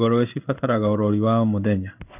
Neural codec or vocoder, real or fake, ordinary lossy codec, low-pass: codec, 16 kHz in and 24 kHz out, 1 kbps, XY-Tokenizer; fake; none; 3.6 kHz